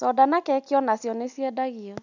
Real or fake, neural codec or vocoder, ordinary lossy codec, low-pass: real; none; none; 7.2 kHz